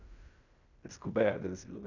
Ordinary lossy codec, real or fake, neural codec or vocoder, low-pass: none; fake; codec, 16 kHz in and 24 kHz out, 0.9 kbps, LongCat-Audio-Codec, fine tuned four codebook decoder; 7.2 kHz